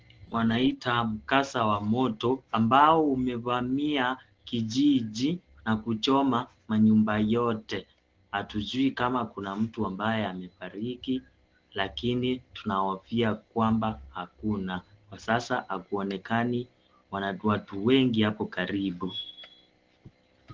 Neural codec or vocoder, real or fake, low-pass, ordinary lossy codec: none; real; 7.2 kHz; Opus, 16 kbps